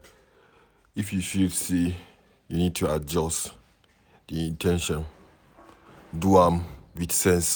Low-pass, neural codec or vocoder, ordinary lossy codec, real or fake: none; none; none; real